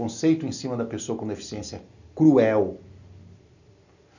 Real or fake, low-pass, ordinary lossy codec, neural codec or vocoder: real; 7.2 kHz; none; none